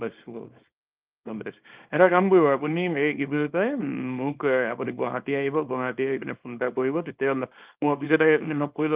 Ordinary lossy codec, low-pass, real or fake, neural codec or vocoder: Opus, 32 kbps; 3.6 kHz; fake; codec, 24 kHz, 0.9 kbps, WavTokenizer, small release